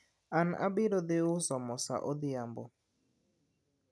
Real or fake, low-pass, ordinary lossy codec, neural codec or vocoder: real; none; none; none